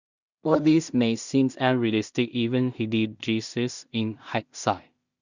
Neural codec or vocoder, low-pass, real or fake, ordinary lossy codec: codec, 16 kHz in and 24 kHz out, 0.4 kbps, LongCat-Audio-Codec, two codebook decoder; 7.2 kHz; fake; Opus, 64 kbps